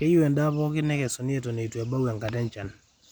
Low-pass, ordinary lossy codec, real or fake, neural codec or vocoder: 19.8 kHz; none; real; none